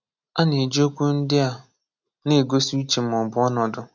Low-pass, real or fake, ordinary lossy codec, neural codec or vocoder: 7.2 kHz; real; none; none